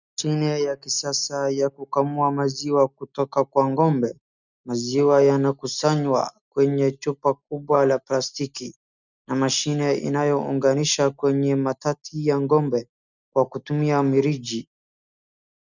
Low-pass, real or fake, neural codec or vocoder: 7.2 kHz; real; none